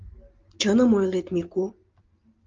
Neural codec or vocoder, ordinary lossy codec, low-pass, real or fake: none; Opus, 24 kbps; 7.2 kHz; real